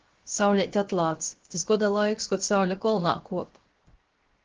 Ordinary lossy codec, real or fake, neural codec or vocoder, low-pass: Opus, 16 kbps; fake; codec, 16 kHz, 0.7 kbps, FocalCodec; 7.2 kHz